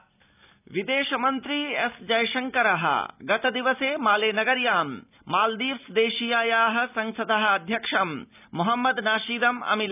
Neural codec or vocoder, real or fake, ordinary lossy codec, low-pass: none; real; none; 3.6 kHz